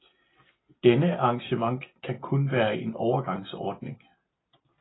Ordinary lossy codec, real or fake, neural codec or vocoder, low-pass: AAC, 16 kbps; real; none; 7.2 kHz